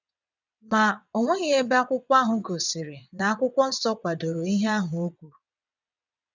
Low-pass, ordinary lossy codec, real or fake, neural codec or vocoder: 7.2 kHz; none; fake; vocoder, 22.05 kHz, 80 mel bands, WaveNeXt